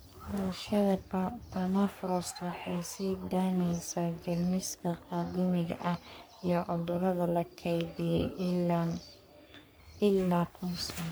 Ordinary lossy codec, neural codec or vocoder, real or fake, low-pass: none; codec, 44.1 kHz, 3.4 kbps, Pupu-Codec; fake; none